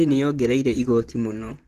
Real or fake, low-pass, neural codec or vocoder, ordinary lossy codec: fake; 14.4 kHz; vocoder, 44.1 kHz, 128 mel bands, Pupu-Vocoder; Opus, 16 kbps